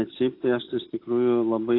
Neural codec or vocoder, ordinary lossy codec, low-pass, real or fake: none; AAC, 32 kbps; 5.4 kHz; real